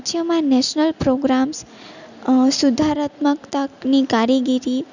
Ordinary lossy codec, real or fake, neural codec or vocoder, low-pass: none; real; none; 7.2 kHz